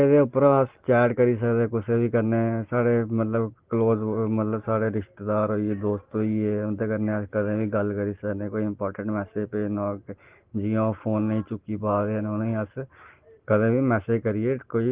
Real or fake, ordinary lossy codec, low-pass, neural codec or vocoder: real; Opus, 16 kbps; 3.6 kHz; none